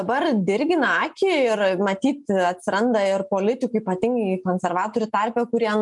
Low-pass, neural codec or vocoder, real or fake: 10.8 kHz; none; real